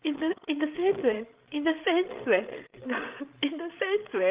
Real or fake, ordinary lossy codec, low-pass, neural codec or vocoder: fake; Opus, 24 kbps; 3.6 kHz; codec, 16 kHz, 16 kbps, FreqCodec, larger model